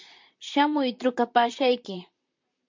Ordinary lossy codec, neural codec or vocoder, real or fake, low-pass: MP3, 48 kbps; vocoder, 44.1 kHz, 128 mel bands, Pupu-Vocoder; fake; 7.2 kHz